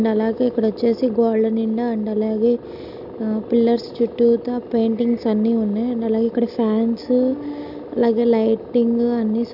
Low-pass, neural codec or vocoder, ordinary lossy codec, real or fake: 5.4 kHz; none; none; real